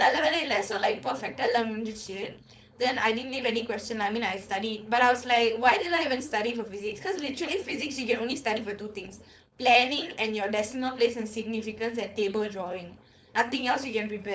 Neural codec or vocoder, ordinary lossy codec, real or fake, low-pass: codec, 16 kHz, 4.8 kbps, FACodec; none; fake; none